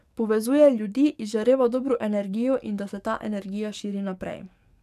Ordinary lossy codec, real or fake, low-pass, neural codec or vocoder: none; fake; 14.4 kHz; codec, 44.1 kHz, 7.8 kbps, DAC